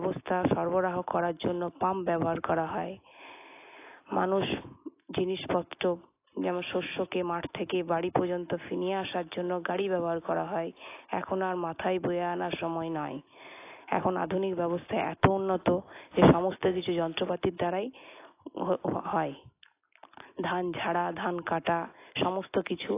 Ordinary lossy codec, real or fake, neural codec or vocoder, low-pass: AAC, 24 kbps; real; none; 3.6 kHz